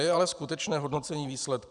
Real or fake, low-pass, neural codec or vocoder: fake; 10.8 kHz; vocoder, 44.1 kHz, 128 mel bands every 512 samples, BigVGAN v2